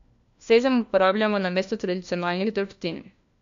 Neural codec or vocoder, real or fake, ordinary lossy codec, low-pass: codec, 16 kHz, 1 kbps, FunCodec, trained on LibriTTS, 50 frames a second; fake; MP3, 64 kbps; 7.2 kHz